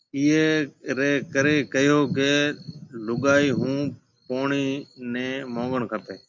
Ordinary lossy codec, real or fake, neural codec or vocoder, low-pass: MP3, 64 kbps; real; none; 7.2 kHz